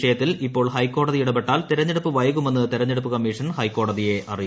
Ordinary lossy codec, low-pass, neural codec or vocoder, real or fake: none; none; none; real